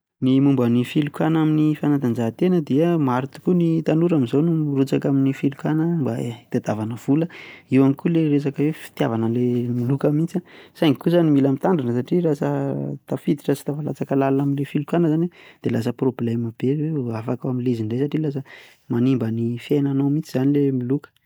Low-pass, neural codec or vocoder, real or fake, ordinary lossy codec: none; none; real; none